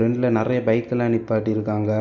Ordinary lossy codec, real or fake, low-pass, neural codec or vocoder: none; real; 7.2 kHz; none